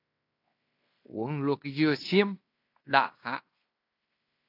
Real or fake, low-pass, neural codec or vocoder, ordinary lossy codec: fake; 5.4 kHz; codec, 16 kHz in and 24 kHz out, 0.9 kbps, LongCat-Audio-Codec, fine tuned four codebook decoder; AAC, 32 kbps